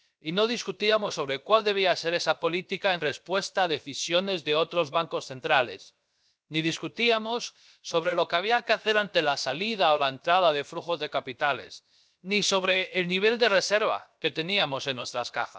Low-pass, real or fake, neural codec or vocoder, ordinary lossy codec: none; fake; codec, 16 kHz, 0.7 kbps, FocalCodec; none